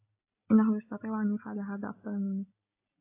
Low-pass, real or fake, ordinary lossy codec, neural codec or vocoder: 3.6 kHz; real; AAC, 24 kbps; none